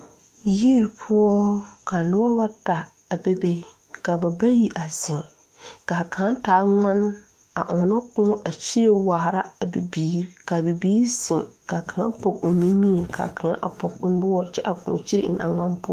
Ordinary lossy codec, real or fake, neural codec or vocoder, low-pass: Opus, 32 kbps; fake; autoencoder, 48 kHz, 32 numbers a frame, DAC-VAE, trained on Japanese speech; 14.4 kHz